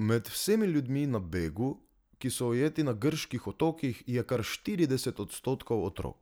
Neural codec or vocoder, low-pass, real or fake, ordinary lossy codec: none; none; real; none